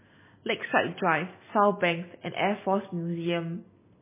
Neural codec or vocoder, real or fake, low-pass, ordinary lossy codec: none; real; 3.6 kHz; MP3, 16 kbps